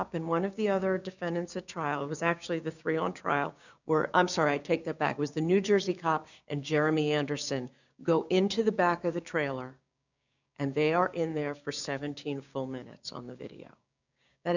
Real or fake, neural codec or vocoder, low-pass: fake; codec, 16 kHz, 6 kbps, DAC; 7.2 kHz